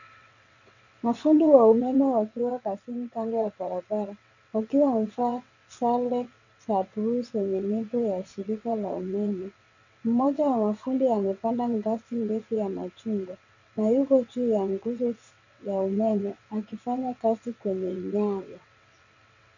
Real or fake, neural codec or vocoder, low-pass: fake; vocoder, 22.05 kHz, 80 mel bands, WaveNeXt; 7.2 kHz